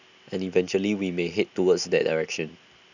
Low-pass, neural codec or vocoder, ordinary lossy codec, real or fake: 7.2 kHz; none; none; real